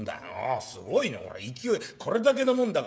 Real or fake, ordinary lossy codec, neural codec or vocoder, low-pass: fake; none; codec, 16 kHz, 16 kbps, FreqCodec, smaller model; none